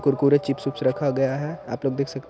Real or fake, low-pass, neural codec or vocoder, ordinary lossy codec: real; none; none; none